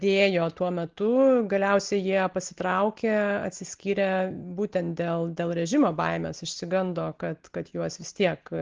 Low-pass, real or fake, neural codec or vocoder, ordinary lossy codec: 7.2 kHz; real; none; Opus, 16 kbps